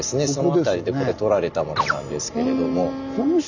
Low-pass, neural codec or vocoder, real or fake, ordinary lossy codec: 7.2 kHz; none; real; none